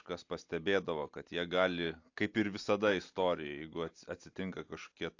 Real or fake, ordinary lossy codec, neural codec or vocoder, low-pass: real; AAC, 48 kbps; none; 7.2 kHz